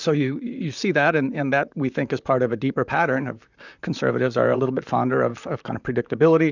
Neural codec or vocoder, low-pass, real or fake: vocoder, 44.1 kHz, 128 mel bands, Pupu-Vocoder; 7.2 kHz; fake